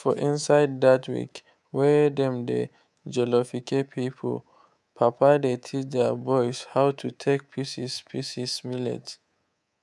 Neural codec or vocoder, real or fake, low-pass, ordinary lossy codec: codec, 24 kHz, 3.1 kbps, DualCodec; fake; none; none